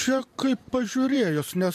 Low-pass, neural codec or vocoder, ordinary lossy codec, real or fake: 14.4 kHz; vocoder, 44.1 kHz, 128 mel bands every 512 samples, BigVGAN v2; MP3, 64 kbps; fake